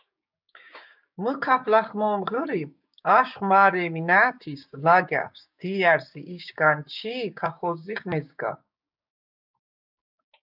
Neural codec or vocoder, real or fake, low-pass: codec, 16 kHz, 6 kbps, DAC; fake; 5.4 kHz